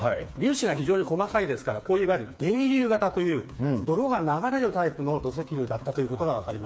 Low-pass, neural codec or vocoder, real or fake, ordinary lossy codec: none; codec, 16 kHz, 4 kbps, FreqCodec, smaller model; fake; none